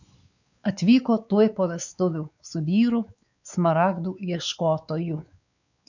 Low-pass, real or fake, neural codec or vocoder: 7.2 kHz; fake; codec, 16 kHz, 4 kbps, X-Codec, WavLM features, trained on Multilingual LibriSpeech